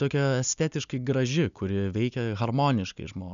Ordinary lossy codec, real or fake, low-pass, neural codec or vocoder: MP3, 96 kbps; real; 7.2 kHz; none